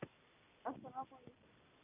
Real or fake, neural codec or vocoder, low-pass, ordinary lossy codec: real; none; 3.6 kHz; none